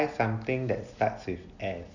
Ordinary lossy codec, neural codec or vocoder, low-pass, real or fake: none; none; 7.2 kHz; real